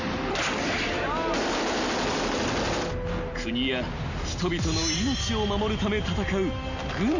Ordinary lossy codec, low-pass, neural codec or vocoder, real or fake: none; 7.2 kHz; none; real